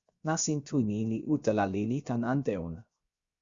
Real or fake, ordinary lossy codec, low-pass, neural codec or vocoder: fake; Opus, 64 kbps; 7.2 kHz; codec, 16 kHz, 0.7 kbps, FocalCodec